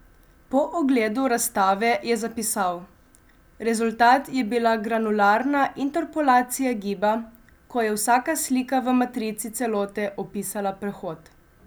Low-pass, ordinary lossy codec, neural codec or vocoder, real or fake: none; none; none; real